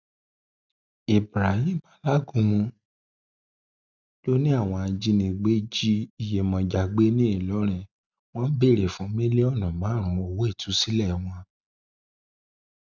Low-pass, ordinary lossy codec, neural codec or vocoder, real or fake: 7.2 kHz; none; none; real